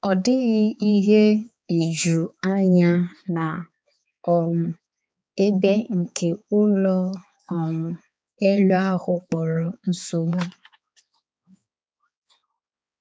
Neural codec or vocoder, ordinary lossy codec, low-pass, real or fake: codec, 16 kHz, 4 kbps, X-Codec, HuBERT features, trained on balanced general audio; none; none; fake